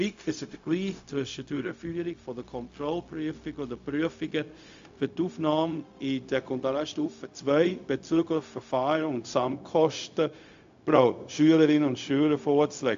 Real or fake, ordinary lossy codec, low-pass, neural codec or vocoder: fake; AAC, 64 kbps; 7.2 kHz; codec, 16 kHz, 0.4 kbps, LongCat-Audio-Codec